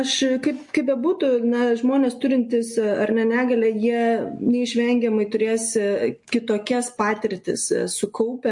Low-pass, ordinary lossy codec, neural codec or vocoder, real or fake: 10.8 kHz; MP3, 48 kbps; none; real